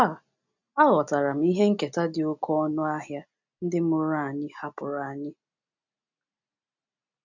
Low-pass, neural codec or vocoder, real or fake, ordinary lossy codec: 7.2 kHz; none; real; none